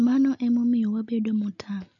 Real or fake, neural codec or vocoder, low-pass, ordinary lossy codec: real; none; 7.2 kHz; none